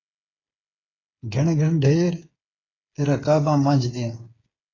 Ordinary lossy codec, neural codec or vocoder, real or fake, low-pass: AAC, 32 kbps; codec, 16 kHz, 8 kbps, FreqCodec, smaller model; fake; 7.2 kHz